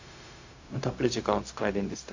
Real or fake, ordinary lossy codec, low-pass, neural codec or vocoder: fake; AAC, 48 kbps; 7.2 kHz; codec, 16 kHz, 0.4 kbps, LongCat-Audio-Codec